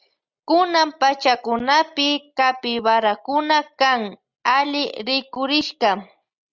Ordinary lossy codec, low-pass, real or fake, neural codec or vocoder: Opus, 64 kbps; 7.2 kHz; real; none